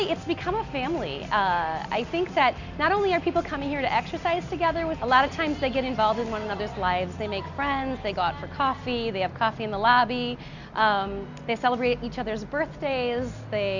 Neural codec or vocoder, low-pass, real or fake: none; 7.2 kHz; real